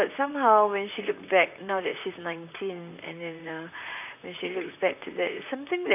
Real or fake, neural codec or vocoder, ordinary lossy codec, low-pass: fake; vocoder, 44.1 kHz, 128 mel bands, Pupu-Vocoder; none; 3.6 kHz